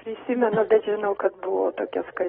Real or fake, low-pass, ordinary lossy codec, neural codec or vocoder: fake; 19.8 kHz; AAC, 16 kbps; vocoder, 44.1 kHz, 128 mel bands every 256 samples, BigVGAN v2